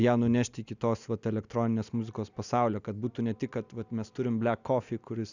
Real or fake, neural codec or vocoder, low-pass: real; none; 7.2 kHz